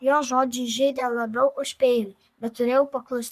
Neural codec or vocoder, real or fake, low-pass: codec, 44.1 kHz, 3.4 kbps, Pupu-Codec; fake; 14.4 kHz